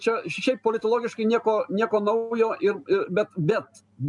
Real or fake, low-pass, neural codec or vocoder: real; 10.8 kHz; none